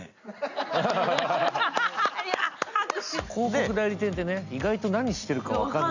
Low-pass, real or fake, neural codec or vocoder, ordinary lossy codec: 7.2 kHz; real; none; none